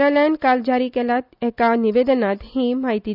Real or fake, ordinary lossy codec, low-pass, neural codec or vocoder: real; none; 5.4 kHz; none